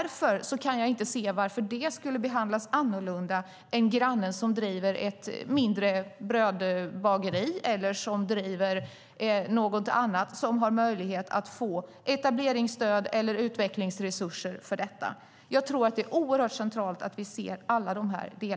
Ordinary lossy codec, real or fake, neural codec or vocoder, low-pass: none; real; none; none